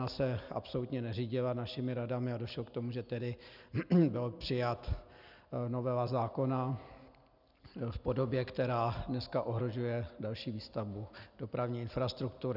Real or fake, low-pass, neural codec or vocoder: real; 5.4 kHz; none